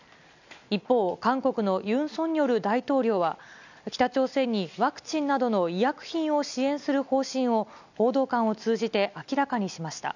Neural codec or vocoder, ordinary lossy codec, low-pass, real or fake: none; none; 7.2 kHz; real